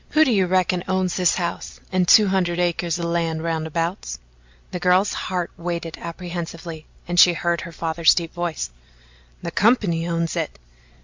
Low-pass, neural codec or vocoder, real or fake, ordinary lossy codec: 7.2 kHz; none; real; MP3, 64 kbps